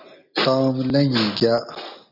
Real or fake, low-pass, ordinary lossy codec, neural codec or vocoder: real; 5.4 kHz; AAC, 48 kbps; none